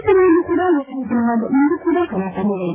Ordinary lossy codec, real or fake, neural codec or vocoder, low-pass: AAC, 16 kbps; fake; vocoder, 24 kHz, 100 mel bands, Vocos; 3.6 kHz